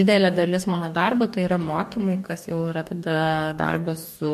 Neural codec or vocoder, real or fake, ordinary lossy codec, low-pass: codec, 44.1 kHz, 2.6 kbps, DAC; fake; MP3, 64 kbps; 14.4 kHz